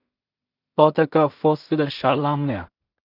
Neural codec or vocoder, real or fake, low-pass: codec, 16 kHz in and 24 kHz out, 0.4 kbps, LongCat-Audio-Codec, two codebook decoder; fake; 5.4 kHz